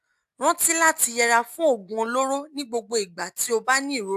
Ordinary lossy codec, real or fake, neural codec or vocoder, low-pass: AAC, 96 kbps; real; none; 14.4 kHz